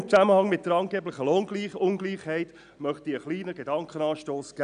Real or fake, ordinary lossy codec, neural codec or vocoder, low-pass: real; none; none; 9.9 kHz